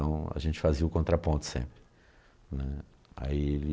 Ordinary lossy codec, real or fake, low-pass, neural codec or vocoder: none; real; none; none